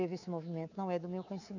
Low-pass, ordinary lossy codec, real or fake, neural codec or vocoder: 7.2 kHz; none; fake; codec, 24 kHz, 3.1 kbps, DualCodec